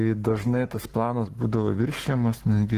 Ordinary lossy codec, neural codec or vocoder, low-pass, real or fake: Opus, 16 kbps; codec, 44.1 kHz, 7.8 kbps, Pupu-Codec; 14.4 kHz; fake